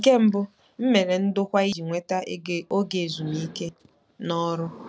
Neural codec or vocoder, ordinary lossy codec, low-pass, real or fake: none; none; none; real